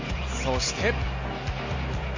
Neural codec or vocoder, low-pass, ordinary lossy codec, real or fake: none; 7.2 kHz; AAC, 48 kbps; real